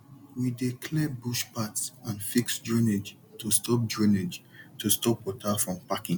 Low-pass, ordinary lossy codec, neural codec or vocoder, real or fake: 19.8 kHz; none; none; real